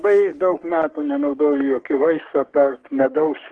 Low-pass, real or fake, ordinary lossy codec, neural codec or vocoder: 10.8 kHz; fake; Opus, 16 kbps; codec, 44.1 kHz, 3.4 kbps, Pupu-Codec